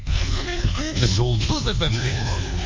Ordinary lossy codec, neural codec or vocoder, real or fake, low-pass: MP3, 48 kbps; codec, 24 kHz, 1.2 kbps, DualCodec; fake; 7.2 kHz